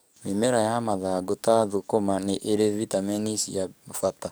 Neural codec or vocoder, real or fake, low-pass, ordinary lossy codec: codec, 44.1 kHz, 7.8 kbps, DAC; fake; none; none